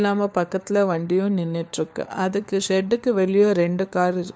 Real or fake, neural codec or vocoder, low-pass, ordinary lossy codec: fake; codec, 16 kHz, 4 kbps, FunCodec, trained on LibriTTS, 50 frames a second; none; none